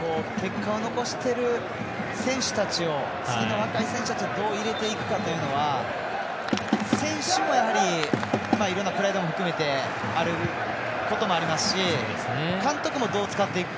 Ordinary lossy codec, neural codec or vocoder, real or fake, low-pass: none; none; real; none